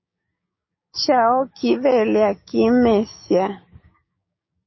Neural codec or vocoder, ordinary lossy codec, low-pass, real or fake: codec, 16 kHz, 6 kbps, DAC; MP3, 24 kbps; 7.2 kHz; fake